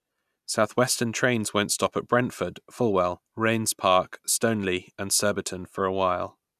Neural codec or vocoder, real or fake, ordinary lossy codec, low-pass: none; real; none; 14.4 kHz